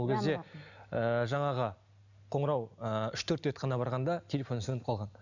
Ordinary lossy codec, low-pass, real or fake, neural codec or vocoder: none; 7.2 kHz; real; none